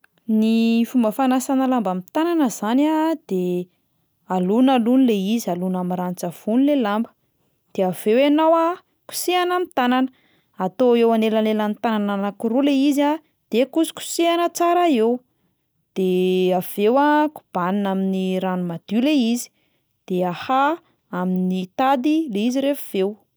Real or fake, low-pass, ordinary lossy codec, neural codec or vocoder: real; none; none; none